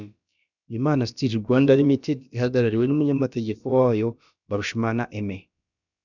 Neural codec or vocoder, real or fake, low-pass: codec, 16 kHz, about 1 kbps, DyCAST, with the encoder's durations; fake; 7.2 kHz